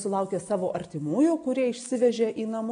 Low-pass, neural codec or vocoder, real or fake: 9.9 kHz; none; real